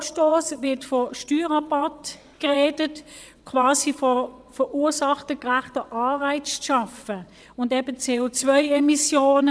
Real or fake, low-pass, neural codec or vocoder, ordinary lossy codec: fake; none; vocoder, 22.05 kHz, 80 mel bands, WaveNeXt; none